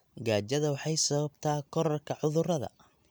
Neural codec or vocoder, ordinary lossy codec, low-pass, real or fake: none; none; none; real